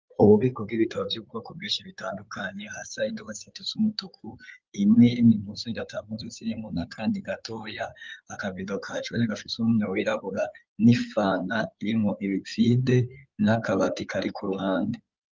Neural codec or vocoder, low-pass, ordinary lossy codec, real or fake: codec, 16 kHz in and 24 kHz out, 2.2 kbps, FireRedTTS-2 codec; 7.2 kHz; Opus, 32 kbps; fake